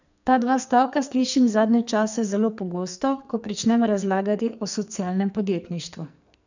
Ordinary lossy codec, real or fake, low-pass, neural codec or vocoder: none; fake; 7.2 kHz; codec, 44.1 kHz, 2.6 kbps, SNAC